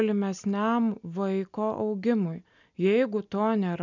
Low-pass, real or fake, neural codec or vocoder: 7.2 kHz; real; none